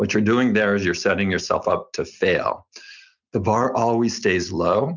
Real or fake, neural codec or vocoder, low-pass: real; none; 7.2 kHz